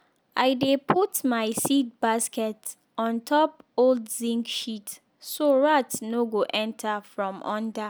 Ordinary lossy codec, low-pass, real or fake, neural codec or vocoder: none; none; real; none